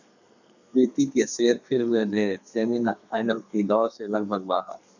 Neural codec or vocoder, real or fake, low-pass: codec, 32 kHz, 1.9 kbps, SNAC; fake; 7.2 kHz